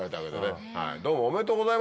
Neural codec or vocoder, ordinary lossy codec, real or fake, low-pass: none; none; real; none